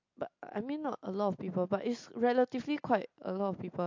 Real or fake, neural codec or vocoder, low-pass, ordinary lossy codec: real; none; 7.2 kHz; MP3, 48 kbps